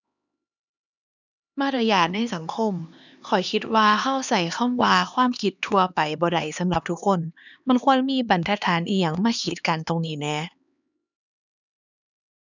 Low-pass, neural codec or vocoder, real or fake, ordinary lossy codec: 7.2 kHz; codec, 16 kHz, 2 kbps, X-Codec, HuBERT features, trained on LibriSpeech; fake; none